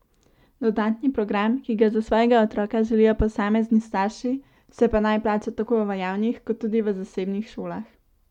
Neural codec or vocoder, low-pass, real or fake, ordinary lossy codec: none; 19.8 kHz; real; MP3, 96 kbps